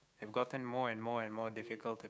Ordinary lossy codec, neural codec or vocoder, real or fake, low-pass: none; codec, 16 kHz, 6 kbps, DAC; fake; none